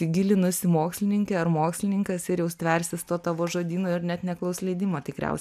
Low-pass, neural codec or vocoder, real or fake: 14.4 kHz; none; real